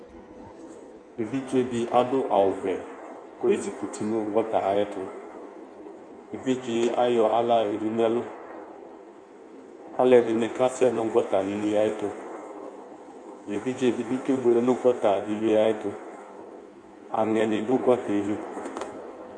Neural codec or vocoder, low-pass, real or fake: codec, 16 kHz in and 24 kHz out, 1.1 kbps, FireRedTTS-2 codec; 9.9 kHz; fake